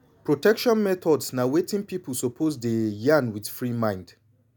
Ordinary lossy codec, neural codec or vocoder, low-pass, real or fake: none; none; none; real